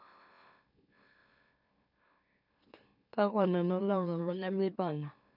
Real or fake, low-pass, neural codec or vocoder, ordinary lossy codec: fake; 5.4 kHz; autoencoder, 44.1 kHz, a latent of 192 numbers a frame, MeloTTS; none